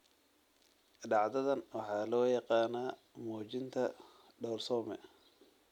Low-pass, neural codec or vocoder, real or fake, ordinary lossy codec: 19.8 kHz; none; real; none